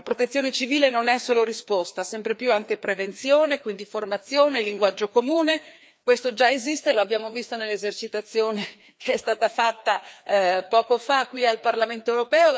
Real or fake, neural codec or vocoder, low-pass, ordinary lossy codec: fake; codec, 16 kHz, 2 kbps, FreqCodec, larger model; none; none